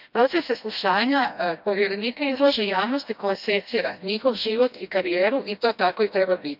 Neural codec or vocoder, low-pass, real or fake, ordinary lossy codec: codec, 16 kHz, 1 kbps, FreqCodec, smaller model; 5.4 kHz; fake; none